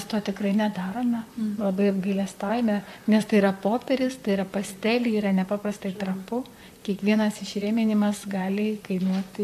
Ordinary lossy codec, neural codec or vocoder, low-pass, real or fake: AAC, 96 kbps; vocoder, 44.1 kHz, 128 mel bands, Pupu-Vocoder; 14.4 kHz; fake